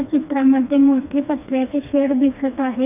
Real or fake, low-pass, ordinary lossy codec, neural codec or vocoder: fake; 3.6 kHz; none; codec, 16 kHz, 2 kbps, FreqCodec, smaller model